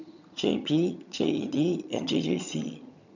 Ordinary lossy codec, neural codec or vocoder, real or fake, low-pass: none; vocoder, 22.05 kHz, 80 mel bands, HiFi-GAN; fake; 7.2 kHz